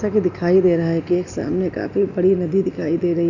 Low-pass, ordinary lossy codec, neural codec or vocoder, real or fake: 7.2 kHz; none; none; real